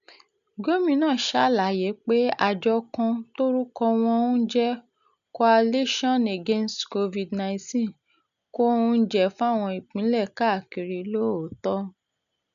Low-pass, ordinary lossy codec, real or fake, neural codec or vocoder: 7.2 kHz; none; real; none